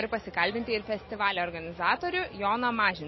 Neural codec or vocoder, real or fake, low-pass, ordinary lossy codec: none; real; 7.2 kHz; MP3, 24 kbps